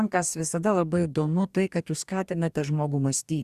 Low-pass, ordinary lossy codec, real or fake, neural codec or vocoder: 14.4 kHz; Opus, 64 kbps; fake; codec, 44.1 kHz, 2.6 kbps, DAC